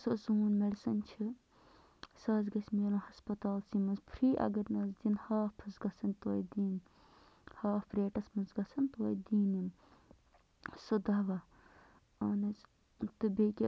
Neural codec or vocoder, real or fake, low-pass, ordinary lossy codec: none; real; none; none